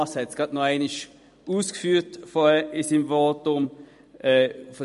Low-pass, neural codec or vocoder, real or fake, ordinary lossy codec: 14.4 kHz; vocoder, 44.1 kHz, 128 mel bands every 256 samples, BigVGAN v2; fake; MP3, 48 kbps